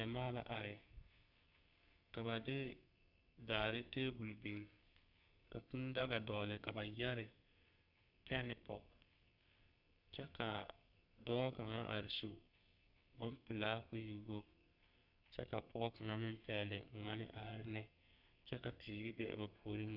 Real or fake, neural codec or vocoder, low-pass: fake; codec, 32 kHz, 1.9 kbps, SNAC; 5.4 kHz